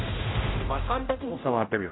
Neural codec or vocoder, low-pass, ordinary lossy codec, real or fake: codec, 16 kHz, 0.5 kbps, X-Codec, HuBERT features, trained on general audio; 7.2 kHz; AAC, 16 kbps; fake